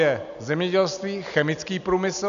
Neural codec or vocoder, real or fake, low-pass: none; real; 7.2 kHz